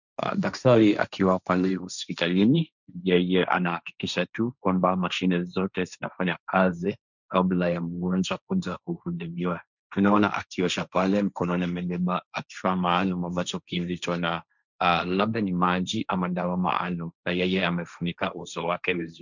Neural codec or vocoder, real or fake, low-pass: codec, 16 kHz, 1.1 kbps, Voila-Tokenizer; fake; 7.2 kHz